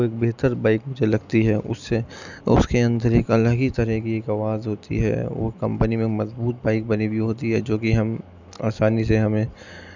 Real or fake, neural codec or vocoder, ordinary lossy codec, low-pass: real; none; none; 7.2 kHz